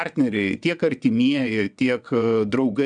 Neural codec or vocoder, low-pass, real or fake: vocoder, 22.05 kHz, 80 mel bands, WaveNeXt; 9.9 kHz; fake